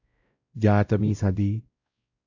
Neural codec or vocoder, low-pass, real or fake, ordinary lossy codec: codec, 16 kHz, 0.5 kbps, X-Codec, WavLM features, trained on Multilingual LibriSpeech; 7.2 kHz; fake; AAC, 48 kbps